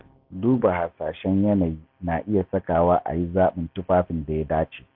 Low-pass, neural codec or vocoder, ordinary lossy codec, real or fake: 5.4 kHz; none; AAC, 48 kbps; real